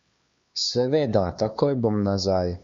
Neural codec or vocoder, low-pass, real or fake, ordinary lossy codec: codec, 16 kHz, 2 kbps, X-Codec, HuBERT features, trained on LibriSpeech; 7.2 kHz; fake; MP3, 48 kbps